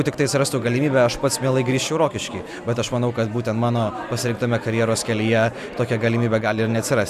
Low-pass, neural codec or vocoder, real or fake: 14.4 kHz; none; real